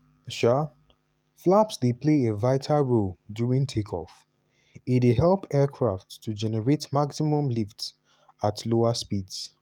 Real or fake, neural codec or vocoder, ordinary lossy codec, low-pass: fake; codec, 44.1 kHz, 7.8 kbps, DAC; none; 19.8 kHz